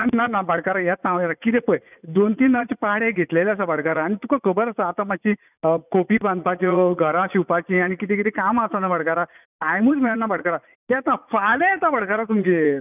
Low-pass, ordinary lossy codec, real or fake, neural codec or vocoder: 3.6 kHz; none; fake; vocoder, 44.1 kHz, 80 mel bands, Vocos